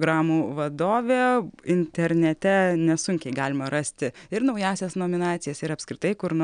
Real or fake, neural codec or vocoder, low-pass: real; none; 9.9 kHz